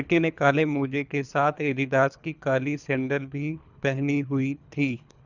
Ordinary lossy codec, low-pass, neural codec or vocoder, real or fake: none; 7.2 kHz; codec, 24 kHz, 3 kbps, HILCodec; fake